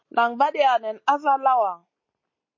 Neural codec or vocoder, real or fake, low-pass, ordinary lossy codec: none; real; 7.2 kHz; MP3, 32 kbps